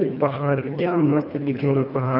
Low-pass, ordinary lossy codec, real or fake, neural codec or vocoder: 5.4 kHz; none; fake; codec, 24 kHz, 1.5 kbps, HILCodec